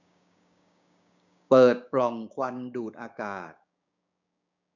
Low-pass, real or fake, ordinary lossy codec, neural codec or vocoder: 7.2 kHz; fake; none; codec, 16 kHz, 6 kbps, DAC